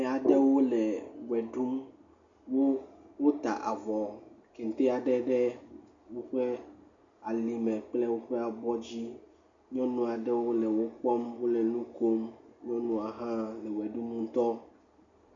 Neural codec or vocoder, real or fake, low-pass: none; real; 7.2 kHz